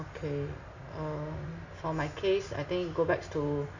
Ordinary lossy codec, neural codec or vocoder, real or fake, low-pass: none; none; real; 7.2 kHz